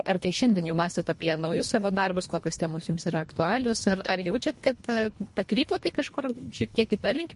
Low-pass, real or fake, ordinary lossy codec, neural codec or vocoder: 10.8 kHz; fake; MP3, 48 kbps; codec, 24 kHz, 1.5 kbps, HILCodec